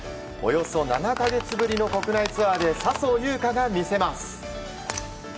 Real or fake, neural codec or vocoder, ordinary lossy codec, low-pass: real; none; none; none